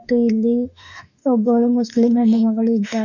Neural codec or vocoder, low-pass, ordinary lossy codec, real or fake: autoencoder, 48 kHz, 32 numbers a frame, DAC-VAE, trained on Japanese speech; 7.2 kHz; none; fake